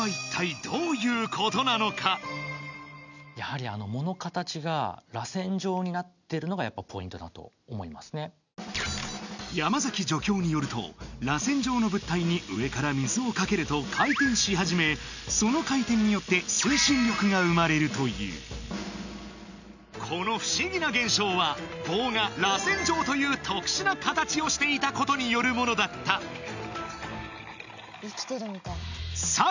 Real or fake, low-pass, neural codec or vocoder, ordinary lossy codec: real; 7.2 kHz; none; none